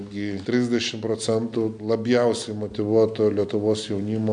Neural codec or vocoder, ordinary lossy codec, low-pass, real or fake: none; MP3, 96 kbps; 9.9 kHz; real